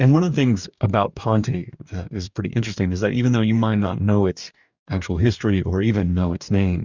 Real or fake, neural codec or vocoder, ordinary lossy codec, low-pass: fake; codec, 44.1 kHz, 2.6 kbps, DAC; Opus, 64 kbps; 7.2 kHz